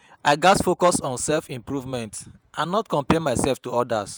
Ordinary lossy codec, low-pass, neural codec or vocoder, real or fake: none; none; vocoder, 48 kHz, 128 mel bands, Vocos; fake